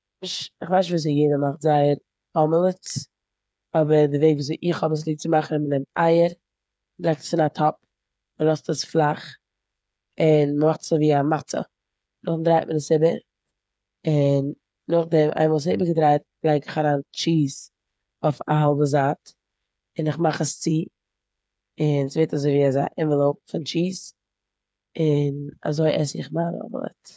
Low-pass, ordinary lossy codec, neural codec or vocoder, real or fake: none; none; codec, 16 kHz, 8 kbps, FreqCodec, smaller model; fake